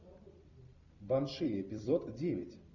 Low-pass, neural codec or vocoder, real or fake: 7.2 kHz; vocoder, 44.1 kHz, 128 mel bands every 256 samples, BigVGAN v2; fake